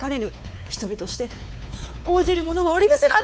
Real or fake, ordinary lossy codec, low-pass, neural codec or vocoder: fake; none; none; codec, 16 kHz, 4 kbps, X-Codec, WavLM features, trained on Multilingual LibriSpeech